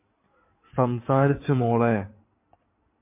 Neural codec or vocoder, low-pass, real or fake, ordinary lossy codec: codec, 16 kHz in and 24 kHz out, 2.2 kbps, FireRedTTS-2 codec; 3.6 kHz; fake; MP3, 24 kbps